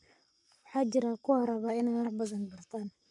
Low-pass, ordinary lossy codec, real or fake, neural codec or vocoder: 10.8 kHz; none; fake; codec, 44.1 kHz, 7.8 kbps, Pupu-Codec